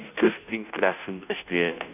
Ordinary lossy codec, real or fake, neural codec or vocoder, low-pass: none; fake; codec, 16 kHz, 0.5 kbps, FunCodec, trained on Chinese and English, 25 frames a second; 3.6 kHz